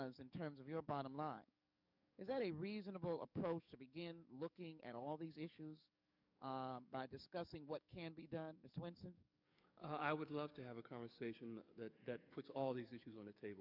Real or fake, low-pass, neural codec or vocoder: fake; 5.4 kHz; codec, 44.1 kHz, 7.8 kbps, DAC